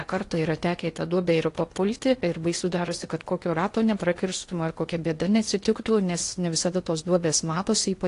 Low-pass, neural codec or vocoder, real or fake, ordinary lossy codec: 10.8 kHz; codec, 16 kHz in and 24 kHz out, 0.8 kbps, FocalCodec, streaming, 65536 codes; fake; AAC, 48 kbps